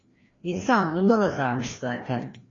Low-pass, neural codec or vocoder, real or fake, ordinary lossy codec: 7.2 kHz; codec, 16 kHz, 1 kbps, FreqCodec, larger model; fake; AAC, 32 kbps